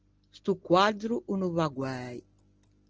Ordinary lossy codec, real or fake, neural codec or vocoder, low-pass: Opus, 16 kbps; real; none; 7.2 kHz